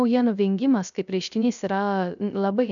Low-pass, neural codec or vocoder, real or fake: 7.2 kHz; codec, 16 kHz, 0.3 kbps, FocalCodec; fake